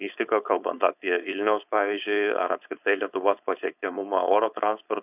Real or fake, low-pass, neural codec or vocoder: fake; 3.6 kHz; codec, 16 kHz, 4.8 kbps, FACodec